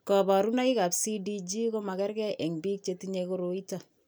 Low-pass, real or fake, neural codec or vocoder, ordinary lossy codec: none; real; none; none